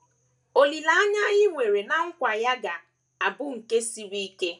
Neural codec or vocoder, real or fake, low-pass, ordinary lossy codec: none; real; 10.8 kHz; none